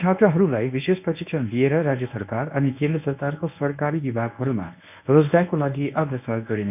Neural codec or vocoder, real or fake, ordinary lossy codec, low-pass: codec, 24 kHz, 0.9 kbps, WavTokenizer, medium speech release version 1; fake; none; 3.6 kHz